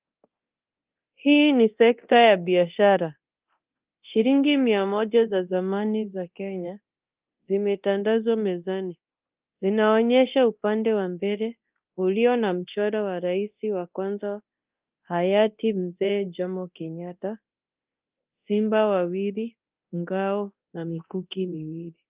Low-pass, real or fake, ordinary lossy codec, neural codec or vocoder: 3.6 kHz; fake; Opus, 24 kbps; codec, 24 kHz, 0.9 kbps, DualCodec